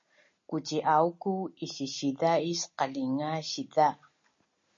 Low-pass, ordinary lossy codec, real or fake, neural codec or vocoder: 7.2 kHz; MP3, 32 kbps; real; none